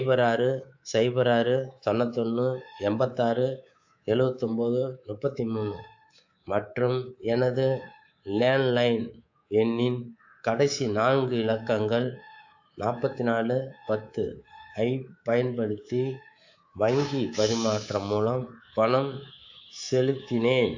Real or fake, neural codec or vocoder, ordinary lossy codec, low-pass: fake; codec, 24 kHz, 3.1 kbps, DualCodec; AAC, 48 kbps; 7.2 kHz